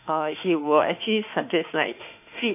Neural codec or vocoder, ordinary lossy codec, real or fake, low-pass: autoencoder, 48 kHz, 32 numbers a frame, DAC-VAE, trained on Japanese speech; none; fake; 3.6 kHz